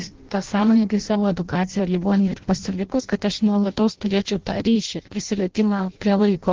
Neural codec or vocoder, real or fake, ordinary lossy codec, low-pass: codec, 16 kHz in and 24 kHz out, 0.6 kbps, FireRedTTS-2 codec; fake; Opus, 16 kbps; 7.2 kHz